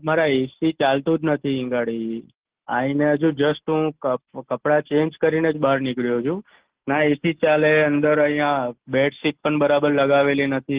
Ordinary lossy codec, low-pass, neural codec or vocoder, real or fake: Opus, 16 kbps; 3.6 kHz; none; real